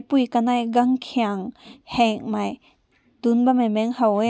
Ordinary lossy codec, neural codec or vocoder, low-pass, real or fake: none; none; none; real